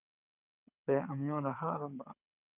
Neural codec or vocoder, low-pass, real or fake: codec, 24 kHz, 6 kbps, HILCodec; 3.6 kHz; fake